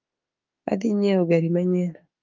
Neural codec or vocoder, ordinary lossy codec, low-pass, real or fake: autoencoder, 48 kHz, 32 numbers a frame, DAC-VAE, trained on Japanese speech; Opus, 24 kbps; 7.2 kHz; fake